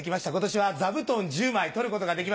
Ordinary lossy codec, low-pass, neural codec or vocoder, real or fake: none; none; none; real